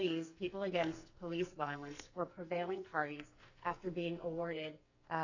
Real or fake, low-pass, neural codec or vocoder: fake; 7.2 kHz; codec, 44.1 kHz, 2.6 kbps, SNAC